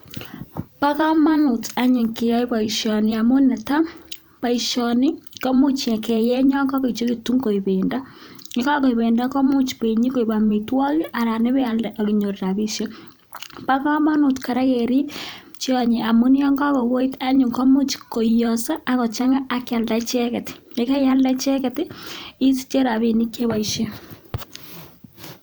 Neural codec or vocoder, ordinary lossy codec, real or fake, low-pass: vocoder, 44.1 kHz, 128 mel bands every 512 samples, BigVGAN v2; none; fake; none